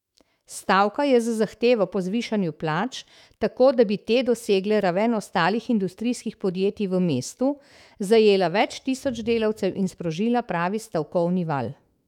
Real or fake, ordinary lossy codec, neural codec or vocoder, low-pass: fake; none; autoencoder, 48 kHz, 128 numbers a frame, DAC-VAE, trained on Japanese speech; 19.8 kHz